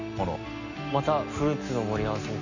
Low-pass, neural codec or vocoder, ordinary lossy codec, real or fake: 7.2 kHz; none; none; real